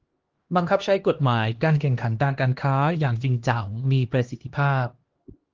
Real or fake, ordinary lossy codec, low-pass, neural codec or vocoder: fake; Opus, 16 kbps; 7.2 kHz; codec, 16 kHz, 1 kbps, X-Codec, HuBERT features, trained on LibriSpeech